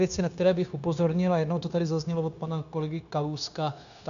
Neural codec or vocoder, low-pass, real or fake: codec, 16 kHz, 0.9 kbps, LongCat-Audio-Codec; 7.2 kHz; fake